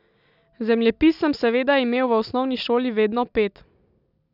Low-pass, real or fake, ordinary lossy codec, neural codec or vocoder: 5.4 kHz; real; none; none